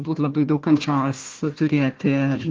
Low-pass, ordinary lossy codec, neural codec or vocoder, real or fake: 7.2 kHz; Opus, 16 kbps; codec, 16 kHz, 1 kbps, FunCodec, trained on Chinese and English, 50 frames a second; fake